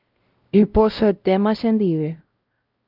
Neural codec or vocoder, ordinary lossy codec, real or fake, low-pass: codec, 16 kHz, 0.5 kbps, X-Codec, WavLM features, trained on Multilingual LibriSpeech; Opus, 24 kbps; fake; 5.4 kHz